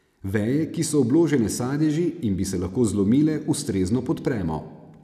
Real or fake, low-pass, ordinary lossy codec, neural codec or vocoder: real; 14.4 kHz; none; none